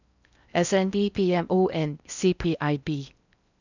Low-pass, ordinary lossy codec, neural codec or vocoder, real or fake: 7.2 kHz; none; codec, 16 kHz in and 24 kHz out, 0.6 kbps, FocalCodec, streaming, 4096 codes; fake